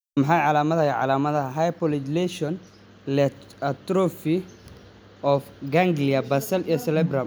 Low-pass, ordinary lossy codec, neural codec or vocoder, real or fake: none; none; none; real